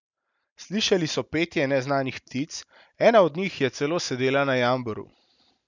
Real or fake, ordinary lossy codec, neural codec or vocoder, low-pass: real; none; none; 7.2 kHz